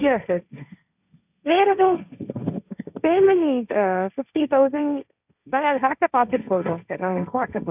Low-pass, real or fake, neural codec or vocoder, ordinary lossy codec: 3.6 kHz; fake; codec, 16 kHz, 1.1 kbps, Voila-Tokenizer; none